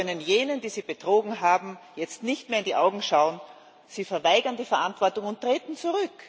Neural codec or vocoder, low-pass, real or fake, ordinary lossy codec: none; none; real; none